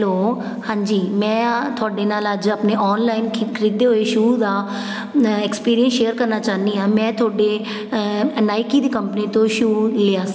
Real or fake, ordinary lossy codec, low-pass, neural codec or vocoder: real; none; none; none